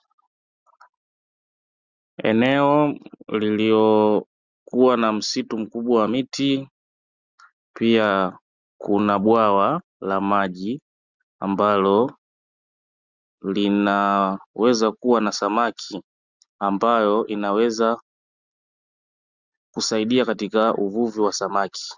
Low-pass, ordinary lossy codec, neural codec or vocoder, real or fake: 7.2 kHz; Opus, 64 kbps; none; real